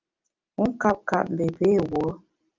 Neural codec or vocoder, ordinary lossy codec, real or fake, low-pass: none; Opus, 24 kbps; real; 7.2 kHz